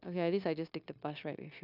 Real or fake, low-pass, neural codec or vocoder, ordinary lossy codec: fake; 5.4 kHz; codec, 16 kHz, 0.9 kbps, LongCat-Audio-Codec; none